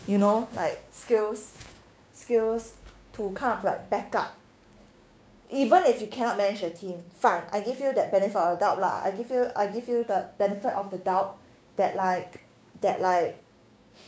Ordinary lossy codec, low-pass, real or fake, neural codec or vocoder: none; none; fake; codec, 16 kHz, 6 kbps, DAC